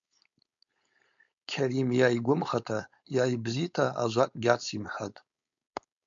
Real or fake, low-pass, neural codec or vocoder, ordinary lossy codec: fake; 7.2 kHz; codec, 16 kHz, 4.8 kbps, FACodec; MP3, 64 kbps